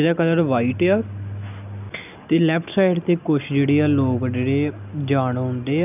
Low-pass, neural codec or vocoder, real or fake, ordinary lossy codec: 3.6 kHz; none; real; none